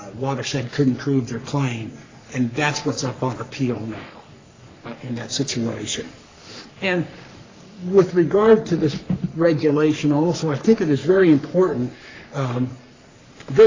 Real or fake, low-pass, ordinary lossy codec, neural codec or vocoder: fake; 7.2 kHz; AAC, 32 kbps; codec, 44.1 kHz, 3.4 kbps, Pupu-Codec